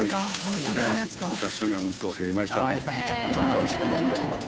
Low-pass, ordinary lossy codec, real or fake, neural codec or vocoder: none; none; fake; codec, 16 kHz, 2 kbps, FunCodec, trained on Chinese and English, 25 frames a second